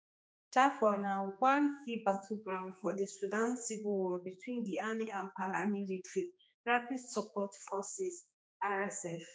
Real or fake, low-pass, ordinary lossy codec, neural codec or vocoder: fake; none; none; codec, 16 kHz, 2 kbps, X-Codec, HuBERT features, trained on general audio